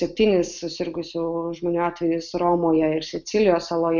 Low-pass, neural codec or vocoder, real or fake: 7.2 kHz; none; real